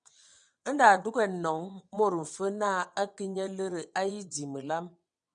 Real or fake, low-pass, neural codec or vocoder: fake; 9.9 kHz; vocoder, 22.05 kHz, 80 mel bands, WaveNeXt